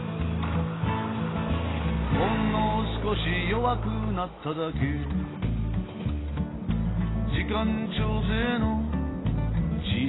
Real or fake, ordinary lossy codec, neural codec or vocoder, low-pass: real; AAC, 16 kbps; none; 7.2 kHz